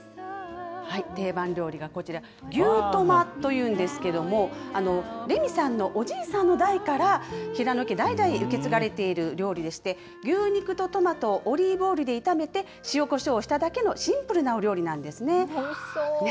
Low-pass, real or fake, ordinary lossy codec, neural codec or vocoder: none; real; none; none